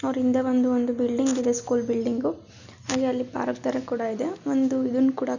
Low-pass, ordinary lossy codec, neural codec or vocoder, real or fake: 7.2 kHz; none; none; real